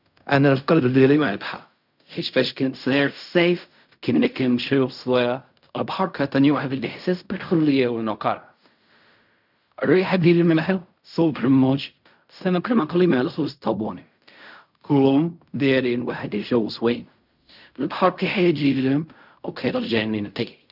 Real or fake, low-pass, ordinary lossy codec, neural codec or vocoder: fake; 5.4 kHz; none; codec, 16 kHz in and 24 kHz out, 0.4 kbps, LongCat-Audio-Codec, fine tuned four codebook decoder